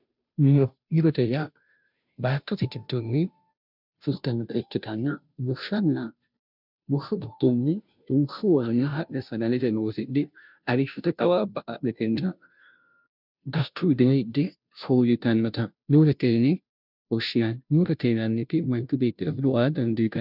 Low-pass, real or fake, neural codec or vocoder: 5.4 kHz; fake; codec, 16 kHz, 0.5 kbps, FunCodec, trained on Chinese and English, 25 frames a second